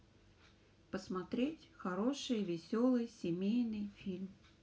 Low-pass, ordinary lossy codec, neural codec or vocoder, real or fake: none; none; none; real